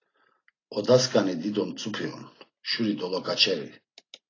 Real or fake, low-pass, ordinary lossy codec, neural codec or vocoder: real; 7.2 kHz; AAC, 32 kbps; none